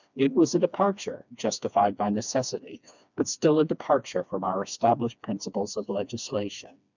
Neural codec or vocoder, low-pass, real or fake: codec, 16 kHz, 2 kbps, FreqCodec, smaller model; 7.2 kHz; fake